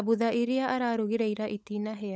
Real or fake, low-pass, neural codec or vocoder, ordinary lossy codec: fake; none; codec, 16 kHz, 16 kbps, FunCodec, trained on LibriTTS, 50 frames a second; none